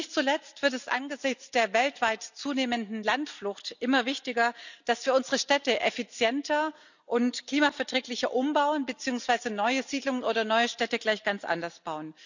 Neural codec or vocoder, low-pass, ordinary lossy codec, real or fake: none; 7.2 kHz; none; real